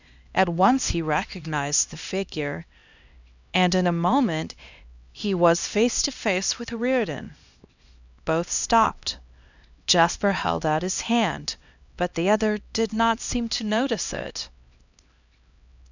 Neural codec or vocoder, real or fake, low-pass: codec, 16 kHz, 1 kbps, X-Codec, HuBERT features, trained on LibriSpeech; fake; 7.2 kHz